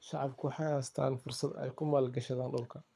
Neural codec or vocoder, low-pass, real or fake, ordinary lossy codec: codec, 24 kHz, 6 kbps, HILCodec; none; fake; none